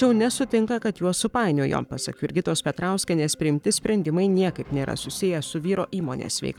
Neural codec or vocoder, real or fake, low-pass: codec, 44.1 kHz, 7.8 kbps, Pupu-Codec; fake; 19.8 kHz